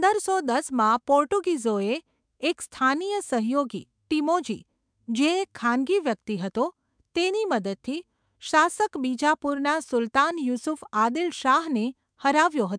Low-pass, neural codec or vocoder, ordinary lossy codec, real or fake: 9.9 kHz; autoencoder, 48 kHz, 128 numbers a frame, DAC-VAE, trained on Japanese speech; MP3, 96 kbps; fake